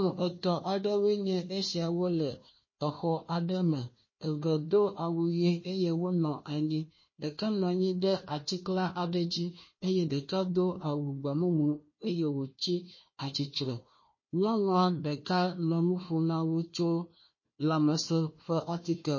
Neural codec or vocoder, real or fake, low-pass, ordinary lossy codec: codec, 16 kHz, 1 kbps, FunCodec, trained on Chinese and English, 50 frames a second; fake; 7.2 kHz; MP3, 32 kbps